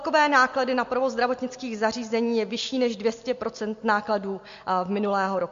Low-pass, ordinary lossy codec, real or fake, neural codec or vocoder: 7.2 kHz; MP3, 48 kbps; real; none